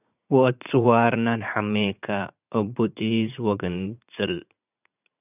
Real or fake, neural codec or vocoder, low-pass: fake; vocoder, 44.1 kHz, 80 mel bands, Vocos; 3.6 kHz